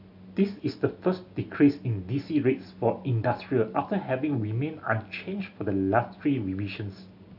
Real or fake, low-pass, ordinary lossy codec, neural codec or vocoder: real; 5.4 kHz; AAC, 48 kbps; none